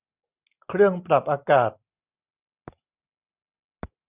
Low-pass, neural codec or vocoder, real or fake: 3.6 kHz; none; real